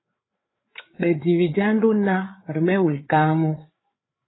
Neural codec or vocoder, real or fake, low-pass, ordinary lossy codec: codec, 16 kHz, 4 kbps, FreqCodec, larger model; fake; 7.2 kHz; AAC, 16 kbps